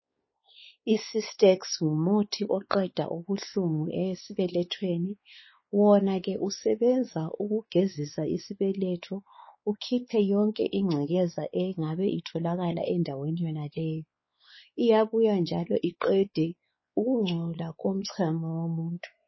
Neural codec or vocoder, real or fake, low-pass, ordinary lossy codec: codec, 16 kHz, 4 kbps, X-Codec, WavLM features, trained on Multilingual LibriSpeech; fake; 7.2 kHz; MP3, 24 kbps